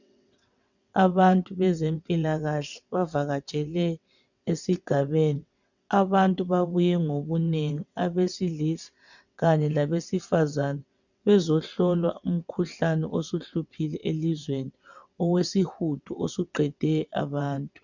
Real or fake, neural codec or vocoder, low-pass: fake; vocoder, 22.05 kHz, 80 mel bands, WaveNeXt; 7.2 kHz